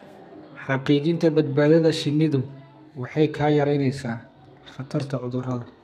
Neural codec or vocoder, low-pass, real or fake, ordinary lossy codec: codec, 32 kHz, 1.9 kbps, SNAC; 14.4 kHz; fake; none